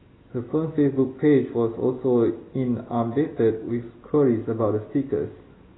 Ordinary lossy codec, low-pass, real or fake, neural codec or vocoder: AAC, 16 kbps; 7.2 kHz; real; none